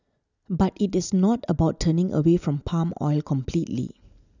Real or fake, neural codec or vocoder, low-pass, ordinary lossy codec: real; none; 7.2 kHz; none